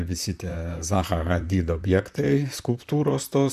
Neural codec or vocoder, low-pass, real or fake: vocoder, 44.1 kHz, 128 mel bands, Pupu-Vocoder; 14.4 kHz; fake